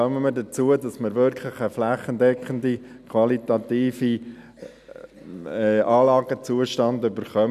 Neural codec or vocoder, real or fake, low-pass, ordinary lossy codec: none; real; 14.4 kHz; none